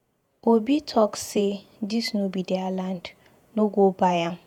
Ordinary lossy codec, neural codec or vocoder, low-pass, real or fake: none; vocoder, 48 kHz, 128 mel bands, Vocos; 19.8 kHz; fake